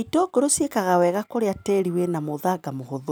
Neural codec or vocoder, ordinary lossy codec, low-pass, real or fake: none; none; none; real